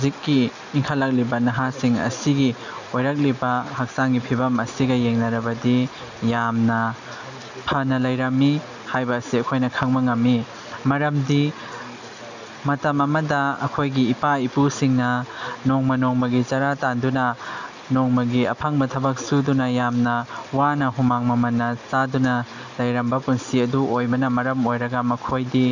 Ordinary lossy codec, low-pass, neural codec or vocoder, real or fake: none; 7.2 kHz; none; real